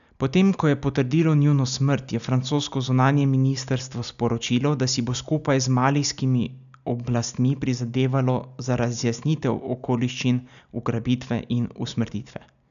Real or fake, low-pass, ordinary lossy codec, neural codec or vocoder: real; 7.2 kHz; none; none